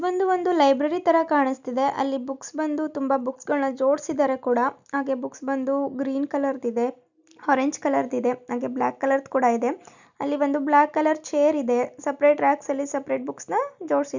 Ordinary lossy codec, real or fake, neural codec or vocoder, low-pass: none; real; none; 7.2 kHz